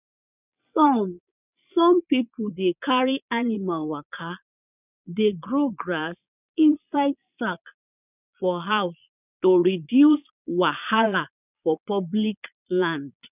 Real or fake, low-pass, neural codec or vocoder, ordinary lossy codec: fake; 3.6 kHz; vocoder, 22.05 kHz, 80 mel bands, Vocos; none